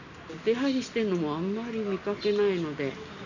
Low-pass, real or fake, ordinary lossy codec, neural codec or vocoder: 7.2 kHz; real; none; none